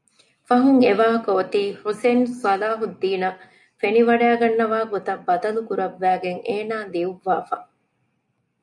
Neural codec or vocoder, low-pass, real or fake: none; 10.8 kHz; real